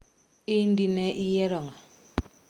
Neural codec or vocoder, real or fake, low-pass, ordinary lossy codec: none; real; 19.8 kHz; Opus, 32 kbps